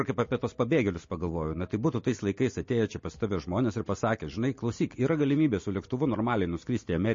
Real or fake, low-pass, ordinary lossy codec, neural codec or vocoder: real; 7.2 kHz; MP3, 32 kbps; none